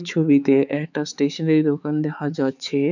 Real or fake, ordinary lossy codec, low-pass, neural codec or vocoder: fake; none; 7.2 kHz; codec, 16 kHz, 4 kbps, X-Codec, HuBERT features, trained on balanced general audio